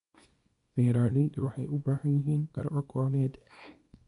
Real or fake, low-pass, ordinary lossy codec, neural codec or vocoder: fake; 10.8 kHz; none; codec, 24 kHz, 0.9 kbps, WavTokenizer, small release